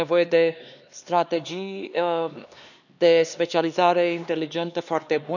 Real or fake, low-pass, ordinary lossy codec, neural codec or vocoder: fake; 7.2 kHz; none; codec, 16 kHz, 2 kbps, X-Codec, HuBERT features, trained on LibriSpeech